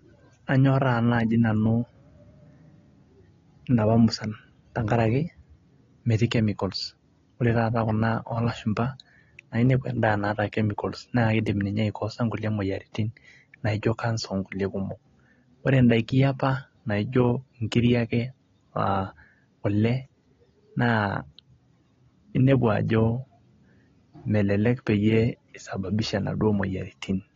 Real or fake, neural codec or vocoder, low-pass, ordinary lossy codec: real; none; 7.2 kHz; AAC, 32 kbps